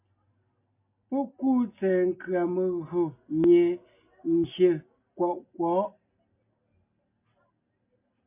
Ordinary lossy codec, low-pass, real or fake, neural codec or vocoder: MP3, 32 kbps; 3.6 kHz; real; none